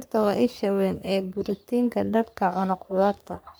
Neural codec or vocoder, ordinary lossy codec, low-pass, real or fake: codec, 44.1 kHz, 3.4 kbps, Pupu-Codec; none; none; fake